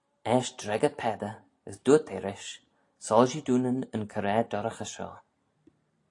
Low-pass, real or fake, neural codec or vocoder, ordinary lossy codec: 10.8 kHz; real; none; AAC, 48 kbps